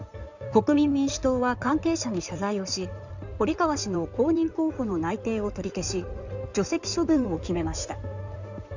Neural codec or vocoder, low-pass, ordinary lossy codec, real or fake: codec, 16 kHz in and 24 kHz out, 2.2 kbps, FireRedTTS-2 codec; 7.2 kHz; none; fake